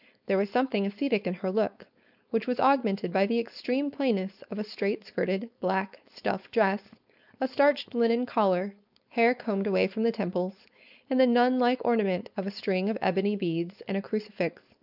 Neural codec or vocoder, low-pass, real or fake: codec, 16 kHz, 4.8 kbps, FACodec; 5.4 kHz; fake